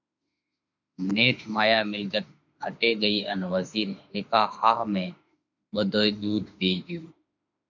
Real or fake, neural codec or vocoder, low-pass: fake; autoencoder, 48 kHz, 32 numbers a frame, DAC-VAE, trained on Japanese speech; 7.2 kHz